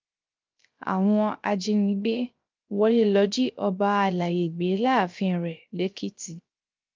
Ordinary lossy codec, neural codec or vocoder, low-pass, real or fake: Opus, 24 kbps; codec, 16 kHz, 0.3 kbps, FocalCodec; 7.2 kHz; fake